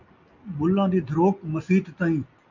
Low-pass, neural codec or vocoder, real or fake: 7.2 kHz; none; real